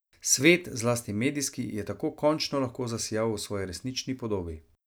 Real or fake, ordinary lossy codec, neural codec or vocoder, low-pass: real; none; none; none